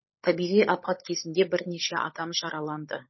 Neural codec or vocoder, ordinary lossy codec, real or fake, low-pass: codec, 16 kHz, 16 kbps, FunCodec, trained on LibriTTS, 50 frames a second; MP3, 24 kbps; fake; 7.2 kHz